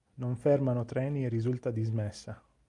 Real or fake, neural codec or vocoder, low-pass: real; none; 10.8 kHz